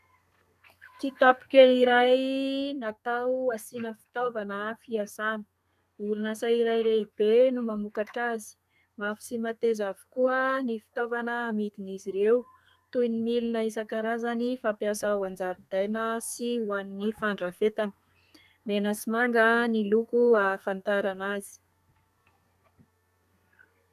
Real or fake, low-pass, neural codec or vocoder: fake; 14.4 kHz; codec, 32 kHz, 1.9 kbps, SNAC